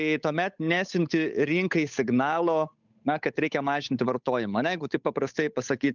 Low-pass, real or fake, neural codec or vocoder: 7.2 kHz; fake; codec, 16 kHz, 8 kbps, FunCodec, trained on Chinese and English, 25 frames a second